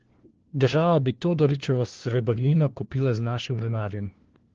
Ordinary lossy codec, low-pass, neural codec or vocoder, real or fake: Opus, 16 kbps; 7.2 kHz; codec, 16 kHz, 1 kbps, FunCodec, trained on LibriTTS, 50 frames a second; fake